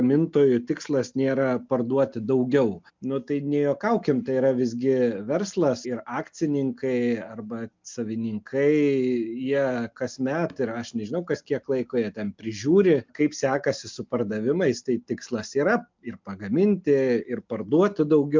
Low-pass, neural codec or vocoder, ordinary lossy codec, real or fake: 7.2 kHz; none; MP3, 64 kbps; real